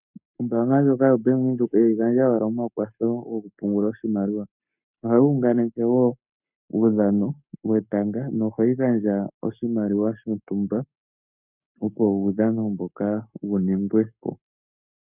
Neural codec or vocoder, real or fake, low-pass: codec, 44.1 kHz, 7.8 kbps, Pupu-Codec; fake; 3.6 kHz